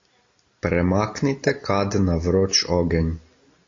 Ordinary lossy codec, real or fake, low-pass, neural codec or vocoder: AAC, 32 kbps; real; 7.2 kHz; none